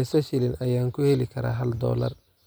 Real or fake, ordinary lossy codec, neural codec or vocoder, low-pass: real; none; none; none